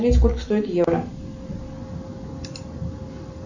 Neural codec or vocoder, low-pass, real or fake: none; 7.2 kHz; real